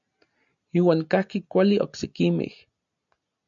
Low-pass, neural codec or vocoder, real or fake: 7.2 kHz; none; real